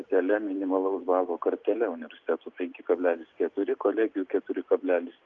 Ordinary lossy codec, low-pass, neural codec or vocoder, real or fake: Opus, 24 kbps; 7.2 kHz; none; real